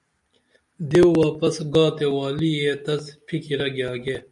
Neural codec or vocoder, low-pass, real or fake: none; 10.8 kHz; real